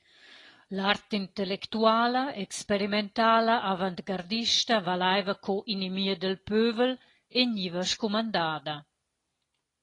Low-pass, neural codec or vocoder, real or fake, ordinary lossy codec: 10.8 kHz; none; real; AAC, 32 kbps